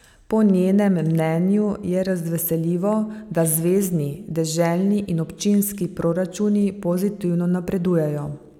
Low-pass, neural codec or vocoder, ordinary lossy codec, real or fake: 19.8 kHz; none; none; real